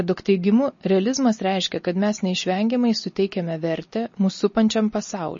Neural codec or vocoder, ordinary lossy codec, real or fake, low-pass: none; MP3, 32 kbps; real; 7.2 kHz